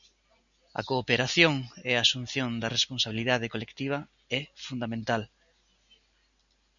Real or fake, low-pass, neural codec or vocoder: real; 7.2 kHz; none